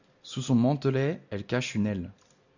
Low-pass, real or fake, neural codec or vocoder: 7.2 kHz; real; none